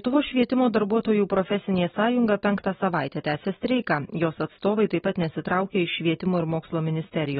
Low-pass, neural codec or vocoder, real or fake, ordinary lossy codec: 19.8 kHz; none; real; AAC, 16 kbps